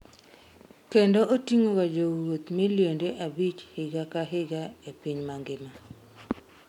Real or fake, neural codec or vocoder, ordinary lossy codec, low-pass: real; none; none; 19.8 kHz